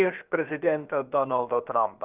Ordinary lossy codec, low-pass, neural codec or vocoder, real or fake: Opus, 32 kbps; 3.6 kHz; codec, 16 kHz, about 1 kbps, DyCAST, with the encoder's durations; fake